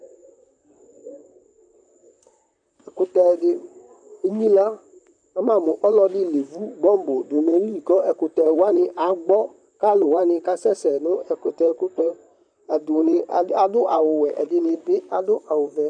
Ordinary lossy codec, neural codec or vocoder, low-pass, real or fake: MP3, 96 kbps; vocoder, 44.1 kHz, 128 mel bands, Pupu-Vocoder; 9.9 kHz; fake